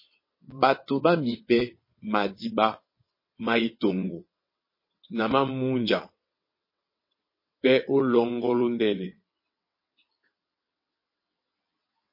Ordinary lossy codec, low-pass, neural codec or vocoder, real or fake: MP3, 24 kbps; 5.4 kHz; vocoder, 22.05 kHz, 80 mel bands, WaveNeXt; fake